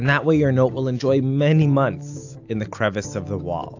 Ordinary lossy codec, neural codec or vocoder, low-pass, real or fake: AAC, 48 kbps; none; 7.2 kHz; real